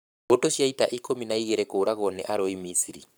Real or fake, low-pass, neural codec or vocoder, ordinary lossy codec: fake; none; codec, 44.1 kHz, 7.8 kbps, Pupu-Codec; none